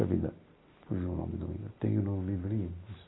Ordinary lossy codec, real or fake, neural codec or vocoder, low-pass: AAC, 16 kbps; real; none; 7.2 kHz